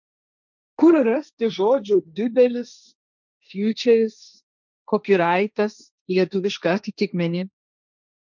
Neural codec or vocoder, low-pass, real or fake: codec, 16 kHz, 1.1 kbps, Voila-Tokenizer; 7.2 kHz; fake